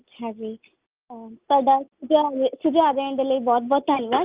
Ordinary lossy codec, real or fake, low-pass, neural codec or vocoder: Opus, 24 kbps; real; 3.6 kHz; none